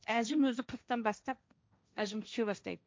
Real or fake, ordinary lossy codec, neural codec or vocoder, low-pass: fake; none; codec, 16 kHz, 1.1 kbps, Voila-Tokenizer; none